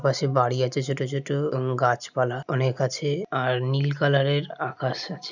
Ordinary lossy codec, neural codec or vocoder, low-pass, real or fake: none; none; 7.2 kHz; real